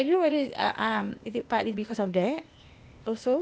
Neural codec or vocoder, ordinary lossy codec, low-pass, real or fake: codec, 16 kHz, 0.8 kbps, ZipCodec; none; none; fake